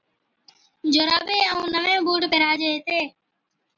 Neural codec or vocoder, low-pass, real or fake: none; 7.2 kHz; real